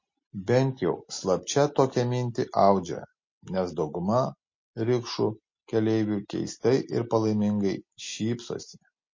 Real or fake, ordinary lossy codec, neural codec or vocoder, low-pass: real; MP3, 32 kbps; none; 7.2 kHz